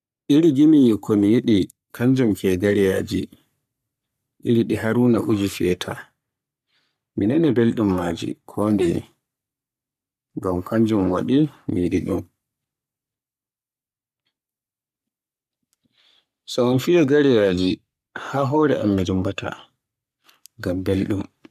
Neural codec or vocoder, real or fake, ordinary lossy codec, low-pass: codec, 44.1 kHz, 3.4 kbps, Pupu-Codec; fake; none; 14.4 kHz